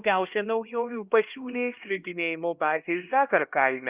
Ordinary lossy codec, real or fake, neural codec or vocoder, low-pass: Opus, 24 kbps; fake; codec, 16 kHz, 1 kbps, X-Codec, HuBERT features, trained on LibriSpeech; 3.6 kHz